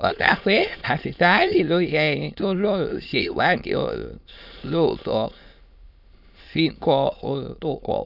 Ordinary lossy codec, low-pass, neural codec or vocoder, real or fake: none; 5.4 kHz; autoencoder, 22.05 kHz, a latent of 192 numbers a frame, VITS, trained on many speakers; fake